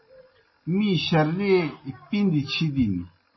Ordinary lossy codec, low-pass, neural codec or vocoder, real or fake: MP3, 24 kbps; 7.2 kHz; none; real